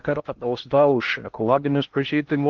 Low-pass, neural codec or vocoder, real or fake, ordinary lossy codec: 7.2 kHz; codec, 16 kHz in and 24 kHz out, 0.6 kbps, FocalCodec, streaming, 2048 codes; fake; Opus, 32 kbps